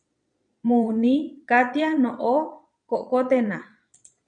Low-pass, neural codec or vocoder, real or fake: 9.9 kHz; vocoder, 22.05 kHz, 80 mel bands, Vocos; fake